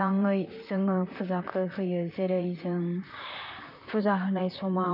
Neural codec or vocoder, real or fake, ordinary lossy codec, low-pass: vocoder, 44.1 kHz, 128 mel bands, Pupu-Vocoder; fake; none; 5.4 kHz